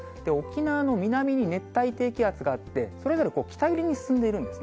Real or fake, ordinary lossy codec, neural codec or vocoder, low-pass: real; none; none; none